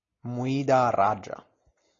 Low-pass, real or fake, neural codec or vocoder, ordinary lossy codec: 7.2 kHz; real; none; AAC, 32 kbps